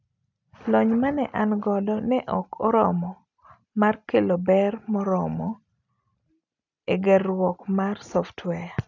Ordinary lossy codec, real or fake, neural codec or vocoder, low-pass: none; real; none; 7.2 kHz